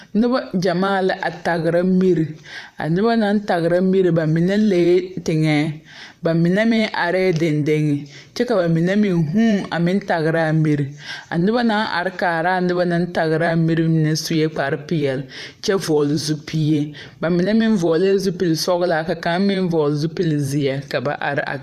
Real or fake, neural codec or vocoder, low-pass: fake; vocoder, 44.1 kHz, 128 mel bands, Pupu-Vocoder; 14.4 kHz